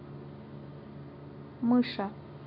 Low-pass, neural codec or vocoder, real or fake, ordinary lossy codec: 5.4 kHz; none; real; MP3, 48 kbps